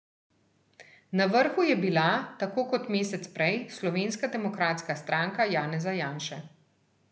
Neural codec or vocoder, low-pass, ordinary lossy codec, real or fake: none; none; none; real